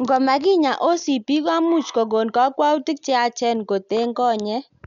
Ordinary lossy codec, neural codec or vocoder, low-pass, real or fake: none; none; 7.2 kHz; real